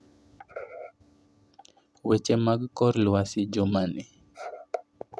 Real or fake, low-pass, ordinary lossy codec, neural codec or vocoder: real; none; none; none